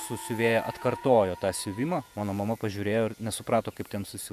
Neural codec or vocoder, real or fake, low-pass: vocoder, 48 kHz, 128 mel bands, Vocos; fake; 14.4 kHz